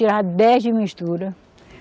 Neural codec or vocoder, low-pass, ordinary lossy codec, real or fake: none; none; none; real